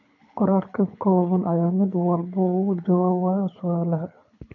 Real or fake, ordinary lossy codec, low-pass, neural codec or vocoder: fake; MP3, 64 kbps; 7.2 kHz; codec, 24 kHz, 6 kbps, HILCodec